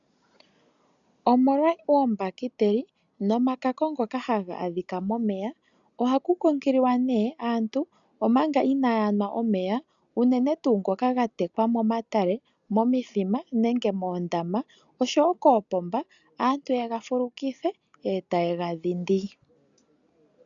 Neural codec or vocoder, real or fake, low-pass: none; real; 7.2 kHz